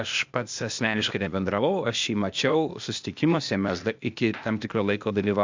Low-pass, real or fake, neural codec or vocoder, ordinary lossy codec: 7.2 kHz; fake; codec, 16 kHz, 0.8 kbps, ZipCodec; MP3, 64 kbps